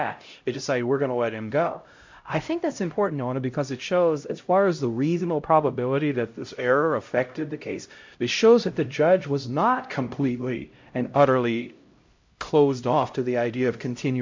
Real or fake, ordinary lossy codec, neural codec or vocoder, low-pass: fake; MP3, 48 kbps; codec, 16 kHz, 0.5 kbps, X-Codec, HuBERT features, trained on LibriSpeech; 7.2 kHz